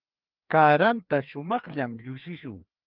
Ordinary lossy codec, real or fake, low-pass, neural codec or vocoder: Opus, 24 kbps; fake; 5.4 kHz; codec, 16 kHz, 2 kbps, FreqCodec, larger model